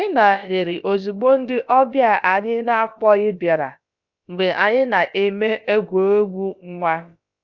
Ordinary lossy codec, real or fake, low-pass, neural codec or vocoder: Opus, 64 kbps; fake; 7.2 kHz; codec, 16 kHz, about 1 kbps, DyCAST, with the encoder's durations